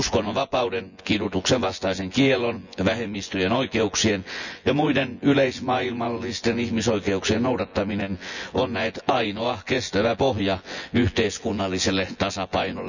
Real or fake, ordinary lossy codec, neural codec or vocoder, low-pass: fake; none; vocoder, 24 kHz, 100 mel bands, Vocos; 7.2 kHz